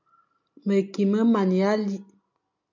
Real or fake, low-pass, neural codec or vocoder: real; 7.2 kHz; none